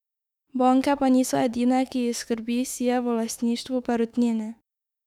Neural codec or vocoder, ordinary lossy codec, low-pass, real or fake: autoencoder, 48 kHz, 32 numbers a frame, DAC-VAE, trained on Japanese speech; none; 19.8 kHz; fake